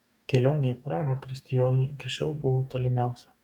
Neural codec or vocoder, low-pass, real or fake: codec, 44.1 kHz, 2.6 kbps, DAC; 19.8 kHz; fake